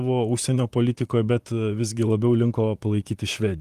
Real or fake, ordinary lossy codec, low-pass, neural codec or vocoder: fake; Opus, 24 kbps; 14.4 kHz; vocoder, 44.1 kHz, 128 mel bands, Pupu-Vocoder